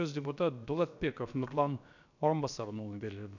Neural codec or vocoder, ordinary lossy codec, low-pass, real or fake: codec, 16 kHz, about 1 kbps, DyCAST, with the encoder's durations; none; 7.2 kHz; fake